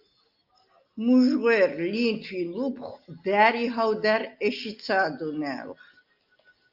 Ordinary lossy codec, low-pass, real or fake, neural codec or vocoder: Opus, 24 kbps; 5.4 kHz; real; none